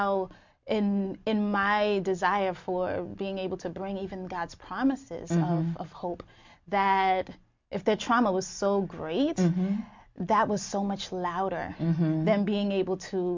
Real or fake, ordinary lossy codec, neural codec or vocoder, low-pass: real; MP3, 64 kbps; none; 7.2 kHz